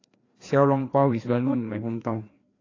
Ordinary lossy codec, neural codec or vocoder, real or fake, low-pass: AAC, 32 kbps; codec, 16 kHz, 2 kbps, FreqCodec, larger model; fake; 7.2 kHz